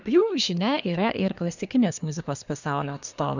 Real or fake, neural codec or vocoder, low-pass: fake; codec, 24 kHz, 1 kbps, SNAC; 7.2 kHz